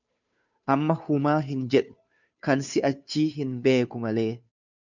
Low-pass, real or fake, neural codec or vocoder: 7.2 kHz; fake; codec, 16 kHz, 2 kbps, FunCodec, trained on Chinese and English, 25 frames a second